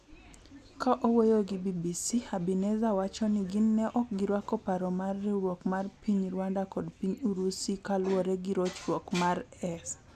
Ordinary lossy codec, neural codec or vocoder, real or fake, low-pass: none; none; real; 14.4 kHz